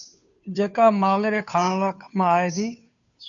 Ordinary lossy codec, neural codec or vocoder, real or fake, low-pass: MP3, 96 kbps; codec, 16 kHz, 2 kbps, FunCodec, trained on Chinese and English, 25 frames a second; fake; 7.2 kHz